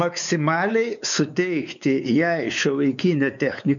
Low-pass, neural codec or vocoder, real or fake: 7.2 kHz; codec, 16 kHz, 4 kbps, X-Codec, WavLM features, trained on Multilingual LibriSpeech; fake